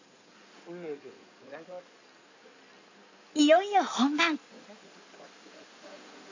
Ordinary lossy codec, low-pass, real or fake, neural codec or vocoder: AAC, 48 kbps; 7.2 kHz; fake; codec, 16 kHz in and 24 kHz out, 2.2 kbps, FireRedTTS-2 codec